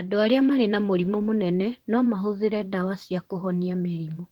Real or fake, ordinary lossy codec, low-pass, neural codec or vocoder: fake; Opus, 16 kbps; 19.8 kHz; vocoder, 44.1 kHz, 128 mel bands, Pupu-Vocoder